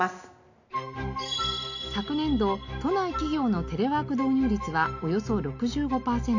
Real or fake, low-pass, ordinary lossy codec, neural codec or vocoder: real; 7.2 kHz; none; none